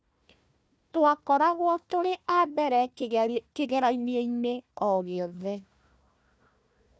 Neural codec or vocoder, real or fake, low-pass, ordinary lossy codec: codec, 16 kHz, 1 kbps, FunCodec, trained on Chinese and English, 50 frames a second; fake; none; none